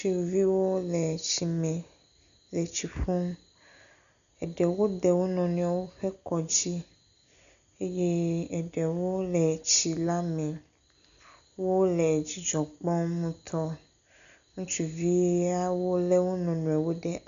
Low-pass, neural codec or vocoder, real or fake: 7.2 kHz; none; real